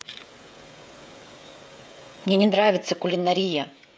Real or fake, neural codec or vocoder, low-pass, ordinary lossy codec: fake; codec, 16 kHz, 16 kbps, FreqCodec, smaller model; none; none